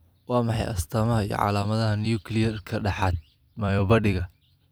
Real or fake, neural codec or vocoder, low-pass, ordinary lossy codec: fake; vocoder, 44.1 kHz, 128 mel bands every 256 samples, BigVGAN v2; none; none